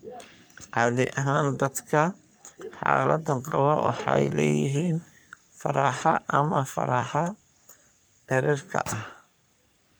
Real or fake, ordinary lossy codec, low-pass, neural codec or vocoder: fake; none; none; codec, 44.1 kHz, 3.4 kbps, Pupu-Codec